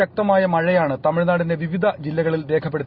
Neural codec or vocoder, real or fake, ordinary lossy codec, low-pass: none; real; Opus, 64 kbps; 5.4 kHz